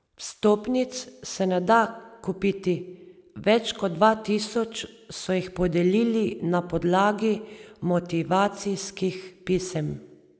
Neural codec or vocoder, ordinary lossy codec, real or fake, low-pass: none; none; real; none